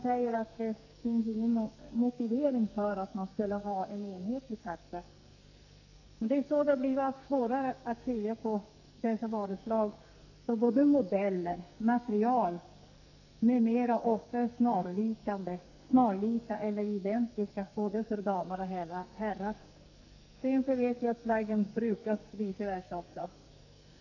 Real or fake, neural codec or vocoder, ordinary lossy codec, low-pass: fake; codec, 44.1 kHz, 2.6 kbps, SNAC; none; 7.2 kHz